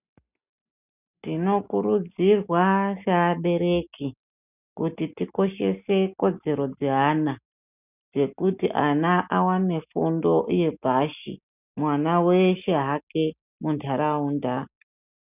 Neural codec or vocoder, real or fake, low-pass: none; real; 3.6 kHz